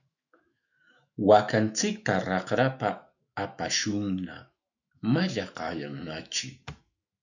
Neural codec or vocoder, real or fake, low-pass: autoencoder, 48 kHz, 128 numbers a frame, DAC-VAE, trained on Japanese speech; fake; 7.2 kHz